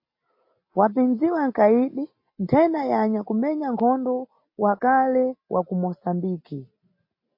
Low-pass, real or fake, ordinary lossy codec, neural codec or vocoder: 5.4 kHz; real; MP3, 32 kbps; none